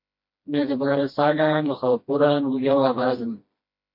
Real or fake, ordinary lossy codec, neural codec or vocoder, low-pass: fake; MP3, 32 kbps; codec, 16 kHz, 1 kbps, FreqCodec, smaller model; 5.4 kHz